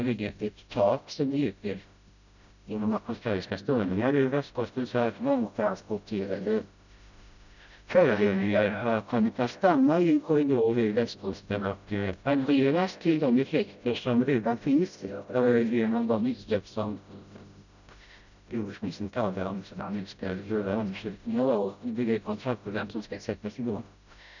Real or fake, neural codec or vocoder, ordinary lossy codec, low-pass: fake; codec, 16 kHz, 0.5 kbps, FreqCodec, smaller model; none; 7.2 kHz